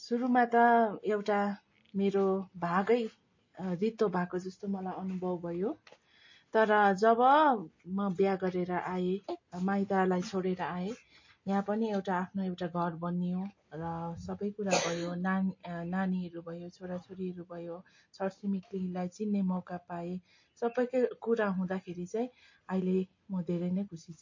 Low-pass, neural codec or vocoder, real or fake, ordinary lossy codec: 7.2 kHz; none; real; MP3, 32 kbps